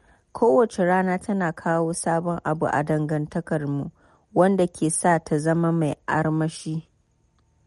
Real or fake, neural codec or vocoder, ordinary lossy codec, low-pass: real; none; MP3, 48 kbps; 19.8 kHz